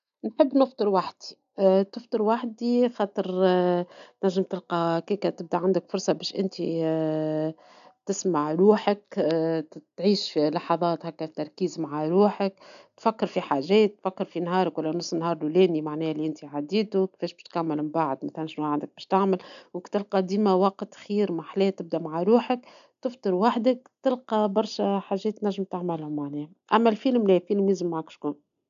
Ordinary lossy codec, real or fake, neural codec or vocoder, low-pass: none; real; none; 7.2 kHz